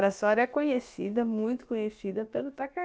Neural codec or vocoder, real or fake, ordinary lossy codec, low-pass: codec, 16 kHz, about 1 kbps, DyCAST, with the encoder's durations; fake; none; none